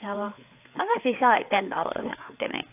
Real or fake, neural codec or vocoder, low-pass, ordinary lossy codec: fake; codec, 16 kHz, 4 kbps, FreqCodec, larger model; 3.6 kHz; AAC, 32 kbps